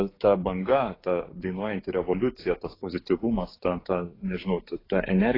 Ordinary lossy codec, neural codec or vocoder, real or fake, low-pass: AAC, 24 kbps; codec, 16 kHz, 6 kbps, DAC; fake; 5.4 kHz